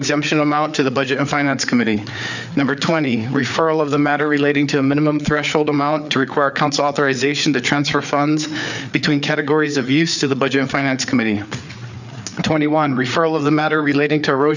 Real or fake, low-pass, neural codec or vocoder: fake; 7.2 kHz; codec, 16 kHz, 4 kbps, FreqCodec, larger model